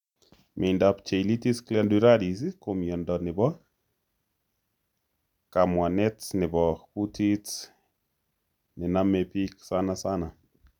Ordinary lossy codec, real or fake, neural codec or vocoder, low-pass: none; real; none; 19.8 kHz